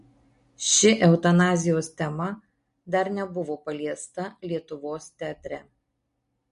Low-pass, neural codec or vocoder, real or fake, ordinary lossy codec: 10.8 kHz; none; real; MP3, 48 kbps